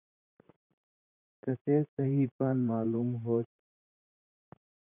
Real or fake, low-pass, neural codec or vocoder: fake; 3.6 kHz; vocoder, 44.1 kHz, 128 mel bands, Pupu-Vocoder